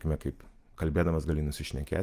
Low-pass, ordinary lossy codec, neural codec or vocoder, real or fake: 14.4 kHz; Opus, 32 kbps; none; real